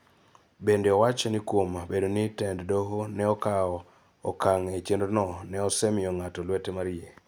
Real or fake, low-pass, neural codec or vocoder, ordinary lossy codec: real; none; none; none